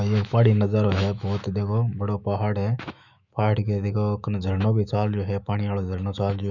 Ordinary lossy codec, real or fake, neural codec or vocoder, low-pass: none; fake; autoencoder, 48 kHz, 128 numbers a frame, DAC-VAE, trained on Japanese speech; 7.2 kHz